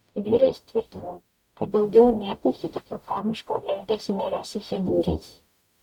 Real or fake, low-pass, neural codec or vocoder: fake; 19.8 kHz; codec, 44.1 kHz, 0.9 kbps, DAC